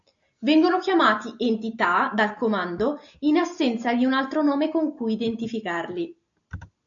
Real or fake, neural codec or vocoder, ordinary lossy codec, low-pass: real; none; AAC, 64 kbps; 7.2 kHz